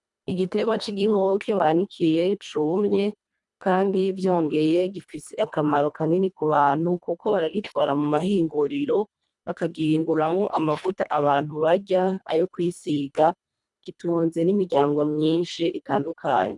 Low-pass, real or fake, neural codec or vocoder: 10.8 kHz; fake; codec, 24 kHz, 1.5 kbps, HILCodec